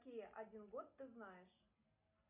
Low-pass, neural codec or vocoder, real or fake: 3.6 kHz; none; real